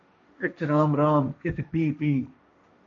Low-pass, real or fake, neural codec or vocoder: 7.2 kHz; fake; codec, 16 kHz, 1.1 kbps, Voila-Tokenizer